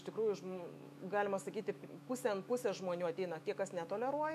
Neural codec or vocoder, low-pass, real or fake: autoencoder, 48 kHz, 128 numbers a frame, DAC-VAE, trained on Japanese speech; 14.4 kHz; fake